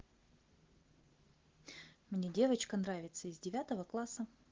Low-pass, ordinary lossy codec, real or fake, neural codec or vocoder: 7.2 kHz; Opus, 16 kbps; real; none